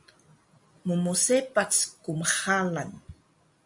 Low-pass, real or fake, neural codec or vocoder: 10.8 kHz; real; none